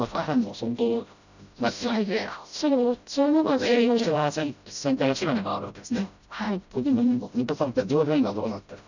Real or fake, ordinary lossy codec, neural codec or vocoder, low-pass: fake; none; codec, 16 kHz, 0.5 kbps, FreqCodec, smaller model; 7.2 kHz